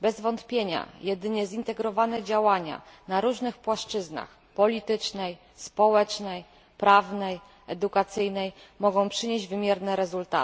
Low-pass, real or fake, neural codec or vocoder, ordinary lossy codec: none; real; none; none